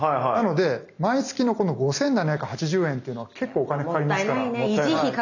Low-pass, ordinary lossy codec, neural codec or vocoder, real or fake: 7.2 kHz; none; none; real